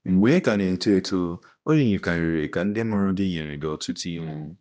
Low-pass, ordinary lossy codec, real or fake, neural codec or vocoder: none; none; fake; codec, 16 kHz, 1 kbps, X-Codec, HuBERT features, trained on balanced general audio